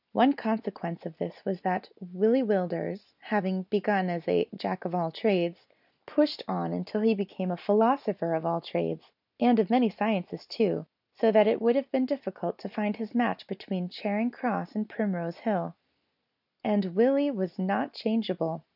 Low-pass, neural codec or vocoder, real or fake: 5.4 kHz; none; real